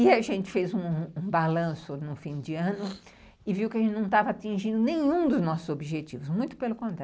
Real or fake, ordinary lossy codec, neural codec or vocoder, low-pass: real; none; none; none